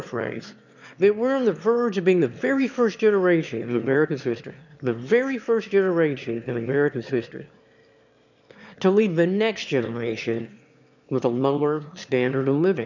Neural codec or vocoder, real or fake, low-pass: autoencoder, 22.05 kHz, a latent of 192 numbers a frame, VITS, trained on one speaker; fake; 7.2 kHz